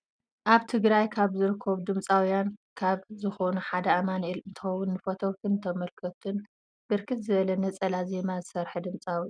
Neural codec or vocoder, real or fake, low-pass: none; real; 9.9 kHz